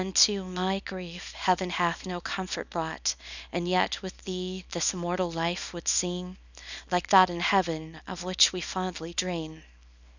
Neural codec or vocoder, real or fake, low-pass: codec, 24 kHz, 0.9 kbps, WavTokenizer, small release; fake; 7.2 kHz